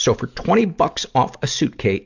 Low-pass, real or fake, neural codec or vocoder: 7.2 kHz; real; none